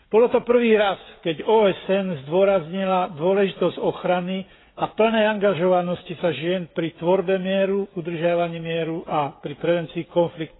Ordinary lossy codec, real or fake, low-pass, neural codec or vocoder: AAC, 16 kbps; fake; 7.2 kHz; codec, 16 kHz, 16 kbps, FreqCodec, smaller model